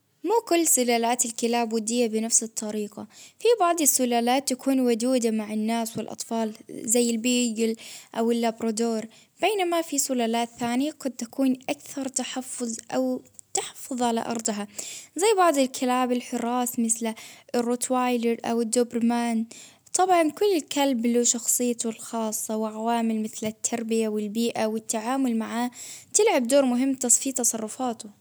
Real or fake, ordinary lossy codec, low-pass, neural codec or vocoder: real; none; none; none